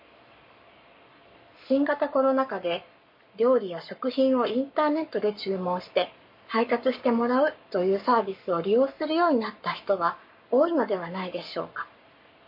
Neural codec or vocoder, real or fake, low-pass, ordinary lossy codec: codec, 44.1 kHz, 7.8 kbps, Pupu-Codec; fake; 5.4 kHz; MP3, 32 kbps